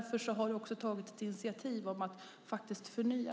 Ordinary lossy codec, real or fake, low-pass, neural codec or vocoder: none; real; none; none